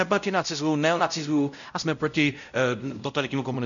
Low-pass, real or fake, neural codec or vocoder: 7.2 kHz; fake; codec, 16 kHz, 0.5 kbps, X-Codec, WavLM features, trained on Multilingual LibriSpeech